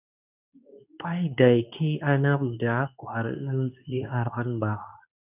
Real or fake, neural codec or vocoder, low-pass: fake; codec, 24 kHz, 0.9 kbps, WavTokenizer, medium speech release version 2; 3.6 kHz